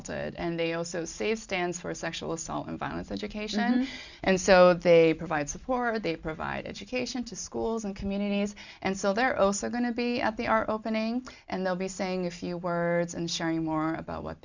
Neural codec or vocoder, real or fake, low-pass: none; real; 7.2 kHz